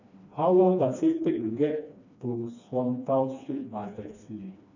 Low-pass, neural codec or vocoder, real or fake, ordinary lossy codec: 7.2 kHz; codec, 16 kHz, 2 kbps, FreqCodec, smaller model; fake; none